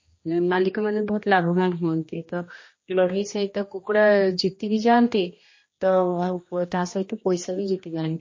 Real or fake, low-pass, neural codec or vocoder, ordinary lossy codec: fake; 7.2 kHz; codec, 16 kHz, 1 kbps, X-Codec, HuBERT features, trained on general audio; MP3, 32 kbps